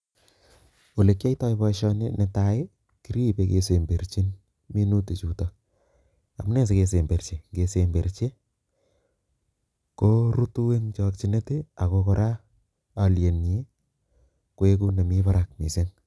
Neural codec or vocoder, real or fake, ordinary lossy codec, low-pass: none; real; none; none